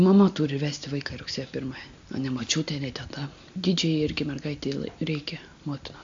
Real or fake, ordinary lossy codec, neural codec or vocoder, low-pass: real; AAC, 64 kbps; none; 7.2 kHz